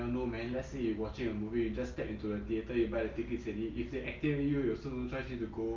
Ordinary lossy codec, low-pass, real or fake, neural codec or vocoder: Opus, 24 kbps; 7.2 kHz; real; none